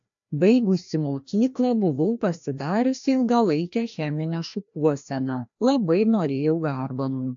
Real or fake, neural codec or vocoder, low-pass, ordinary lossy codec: fake; codec, 16 kHz, 1 kbps, FreqCodec, larger model; 7.2 kHz; AAC, 64 kbps